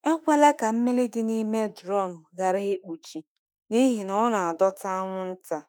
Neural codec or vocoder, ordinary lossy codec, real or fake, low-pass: autoencoder, 48 kHz, 32 numbers a frame, DAC-VAE, trained on Japanese speech; none; fake; none